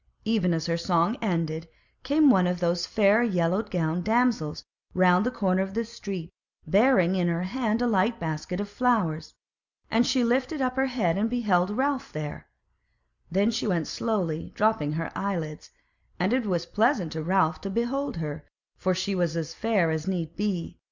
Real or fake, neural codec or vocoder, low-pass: real; none; 7.2 kHz